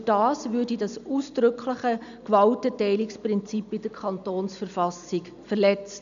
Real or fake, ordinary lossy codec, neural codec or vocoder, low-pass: real; none; none; 7.2 kHz